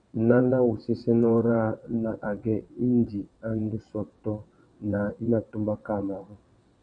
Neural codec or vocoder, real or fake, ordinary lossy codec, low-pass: vocoder, 22.05 kHz, 80 mel bands, WaveNeXt; fake; MP3, 96 kbps; 9.9 kHz